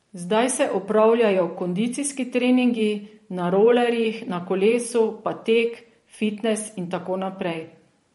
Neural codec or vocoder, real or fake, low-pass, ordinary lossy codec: vocoder, 44.1 kHz, 128 mel bands every 512 samples, BigVGAN v2; fake; 19.8 kHz; MP3, 48 kbps